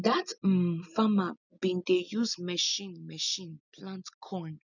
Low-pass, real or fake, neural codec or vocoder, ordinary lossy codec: 7.2 kHz; real; none; none